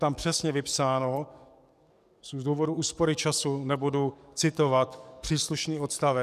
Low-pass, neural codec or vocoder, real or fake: 14.4 kHz; codec, 44.1 kHz, 7.8 kbps, DAC; fake